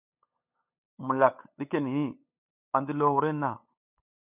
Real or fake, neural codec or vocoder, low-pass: fake; codec, 16 kHz, 8 kbps, FunCodec, trained on LibriTTS, 25 frames a second; 3.6 kHz